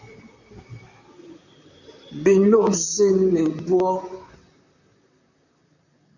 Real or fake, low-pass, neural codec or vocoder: fake; 7.2 kHz; vocoder, 44.1 kHz, 128 mel bands, Pupu-Vocoder